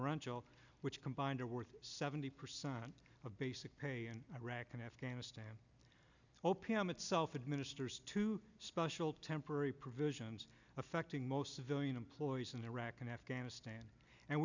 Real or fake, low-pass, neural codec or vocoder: real; 7.2 kHz; none